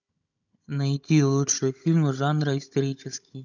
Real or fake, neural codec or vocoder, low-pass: fake; codec, 16 kHz, 16 kbps, FunCodec, trained on Chinese and English, 50 frames a second; 7.2 kHz